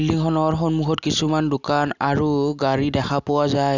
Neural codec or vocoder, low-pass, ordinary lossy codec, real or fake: none; 7.2 kHz; none; real